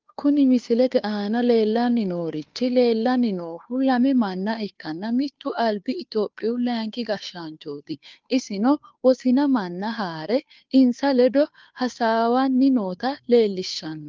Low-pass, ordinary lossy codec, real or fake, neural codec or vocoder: 7.2 kHz; Opus, 32 kbps; fake; codec, 16 kHz, 2 kbps, FunCodec, trained on Chinese and English, 25 frames a second